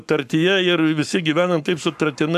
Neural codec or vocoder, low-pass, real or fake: codec, 44.1 kHz, 7.8 kbps, Pupu-Codec; 14.4 kHz; fake